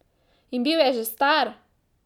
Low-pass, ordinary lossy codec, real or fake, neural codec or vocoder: 19.8 kHz; none; real; none